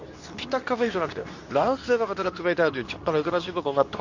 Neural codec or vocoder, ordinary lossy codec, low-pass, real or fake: codec, 24 kHz, 0.9 kbps, WavTokenizer, medium speech release version 2; none; 7.2 kHz; fake